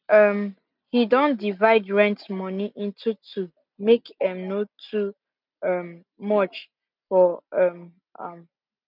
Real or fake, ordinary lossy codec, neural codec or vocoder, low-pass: real; none; none; 5.4 kHz